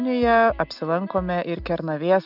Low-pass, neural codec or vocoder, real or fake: 5.4 kHz; none; real